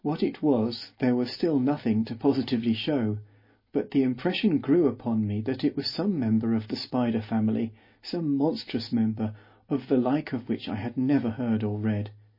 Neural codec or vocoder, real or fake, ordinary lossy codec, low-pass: none; real; MP3, 24 kbps; 5.4 kHz